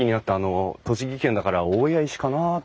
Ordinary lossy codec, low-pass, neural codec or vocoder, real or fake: none; none; none; real